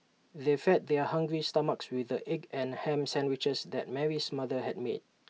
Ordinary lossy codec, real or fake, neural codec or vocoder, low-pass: none; real; none; none